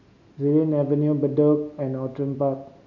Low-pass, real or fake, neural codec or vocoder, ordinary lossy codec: 7.2 kHz; real; none; none